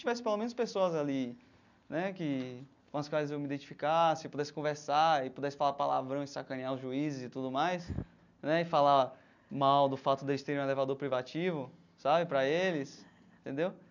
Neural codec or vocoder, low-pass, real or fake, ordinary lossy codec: none; 7.2 kHz; real; none